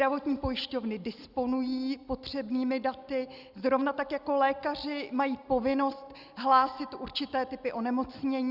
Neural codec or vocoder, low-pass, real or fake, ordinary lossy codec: none; 5.4 kHz; real; Opus, 64 kbps